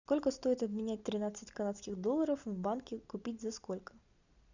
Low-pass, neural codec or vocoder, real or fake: 7.2 kHz; none; real